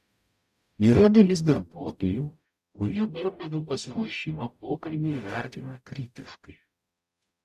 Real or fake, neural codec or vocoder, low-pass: fake; codec, 44.1 kHz, 0.9 kbps, DAC; 14.4 kHz